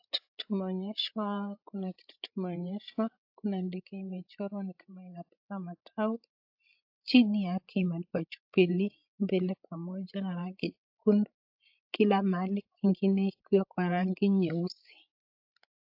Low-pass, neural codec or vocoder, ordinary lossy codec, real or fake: 5.4 kHz; codec, 16 kHz, 16 kbps, FreqCodec, larger model; AAC, 48 kbps; fake